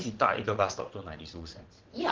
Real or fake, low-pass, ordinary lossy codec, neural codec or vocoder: fake; 7.2 kHz; Opus, 16 kbps; vocoder, 22.05 kHz, 80 mel bands, WaveNeXt